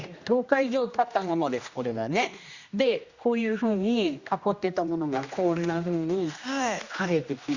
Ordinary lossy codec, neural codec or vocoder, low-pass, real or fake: Opus, 64 kbps; codec, 16 kHz, 1 kbps, X-Codec, HuBERT features, trained on general audio; 7.2 kHz; fake